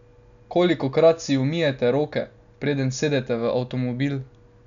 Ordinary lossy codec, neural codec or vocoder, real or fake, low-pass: none; none; real; 7.2 kHz